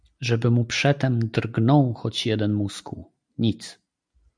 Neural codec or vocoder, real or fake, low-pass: none; real; 9.9 kHz